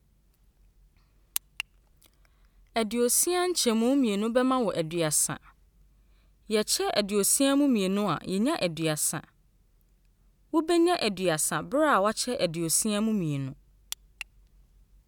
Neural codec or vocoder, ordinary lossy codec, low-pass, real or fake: none; none; 19.8 kHz; real